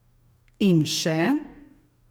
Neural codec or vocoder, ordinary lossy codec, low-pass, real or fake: codec, 44.1 kHz, 2.6 kbps, DAC; none; none; fake